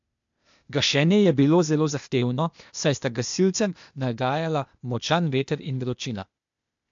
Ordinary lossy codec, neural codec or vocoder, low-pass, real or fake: MP3, 64 kbps; codec, 16 kHz, 0.8 kbps, ZipCodec; 7.2 kHz; fake